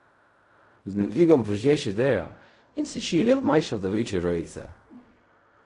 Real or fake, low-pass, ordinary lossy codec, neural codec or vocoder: fake; 10.8 kHz; AAC, 48 kbps; codec, 16 kHz in and 24 kHz out, 0.4 kbps, LongCat-Audio-Codec, fine tuned four codebook decoder